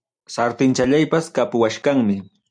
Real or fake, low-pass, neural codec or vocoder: real; 9.9 kHz; none